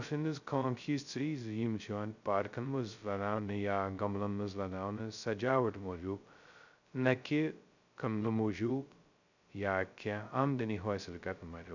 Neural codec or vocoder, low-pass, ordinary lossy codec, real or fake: codec, 16 kHz, 0.2 kbps, FocalCodec; 7.2 kHz; none; fake